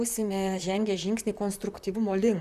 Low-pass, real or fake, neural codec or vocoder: 14.4 kHz; fake; vocoder, 44.1 kHz, 128 mel bands, Pupu-Vocoder